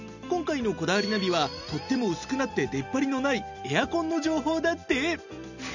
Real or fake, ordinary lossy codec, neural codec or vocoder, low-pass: real; none; none; 7.2 kHz